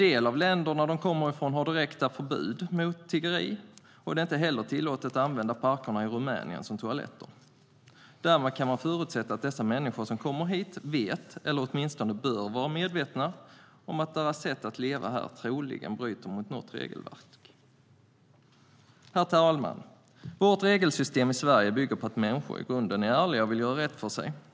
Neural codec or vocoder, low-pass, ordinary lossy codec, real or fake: none; none; none; real